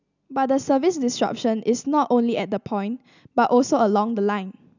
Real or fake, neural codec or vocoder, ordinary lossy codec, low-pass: real; none; none; 7.2 kHz